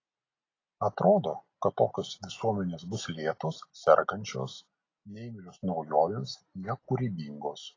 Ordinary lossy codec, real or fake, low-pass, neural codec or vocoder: AAC, 32 kbps; real; 7.2 kHz; none